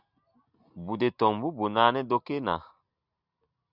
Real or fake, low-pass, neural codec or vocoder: real; 5.4 kHz; none